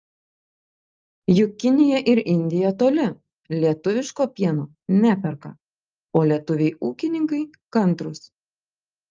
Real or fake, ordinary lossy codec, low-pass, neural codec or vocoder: real; Opus, 24 kbps; 7.2 kHz; none